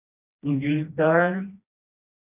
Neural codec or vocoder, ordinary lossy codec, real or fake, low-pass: codec, 16 kHz, 1 kbps, FreqCodec, smaller model; none; fake; 3.6 kHz